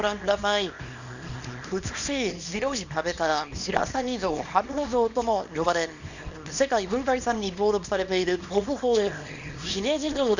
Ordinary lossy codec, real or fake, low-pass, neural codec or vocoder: none; fake; 7.2 kHz; codec, 24 kHz, 0.9 kbps, WavTokenizer, small release